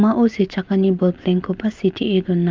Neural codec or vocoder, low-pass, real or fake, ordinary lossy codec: none; 7.2 kHz; real; Opus, 32 kbps